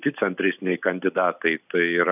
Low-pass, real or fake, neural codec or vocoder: 3.6 kHz; real; none